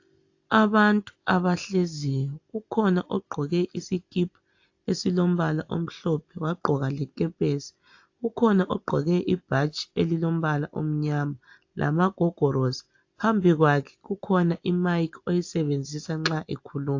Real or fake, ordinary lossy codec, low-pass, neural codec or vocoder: real; AAC, 48 kbps; 7.2 kHz; none